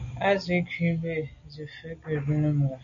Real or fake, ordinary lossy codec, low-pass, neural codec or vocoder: real; MP3, 64 kbps; 7.2 kHz; none